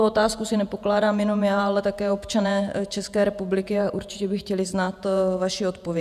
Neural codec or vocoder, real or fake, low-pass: vocoder, 48 kHz, 128 mel bands, Vocos; fake; 14.4 kHz